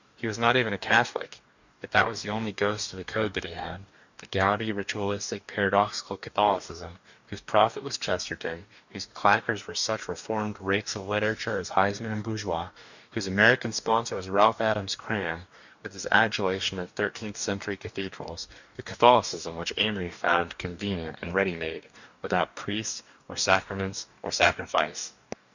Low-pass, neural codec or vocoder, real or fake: 7.2 kHz; codec, 44.1 kHz, 2.6 kbps, DAC; fake